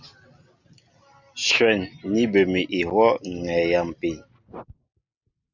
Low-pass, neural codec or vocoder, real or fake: 7.2 kHz; none; real